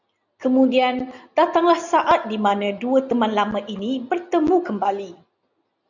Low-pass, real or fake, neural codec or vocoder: 7.2 kHz; real; none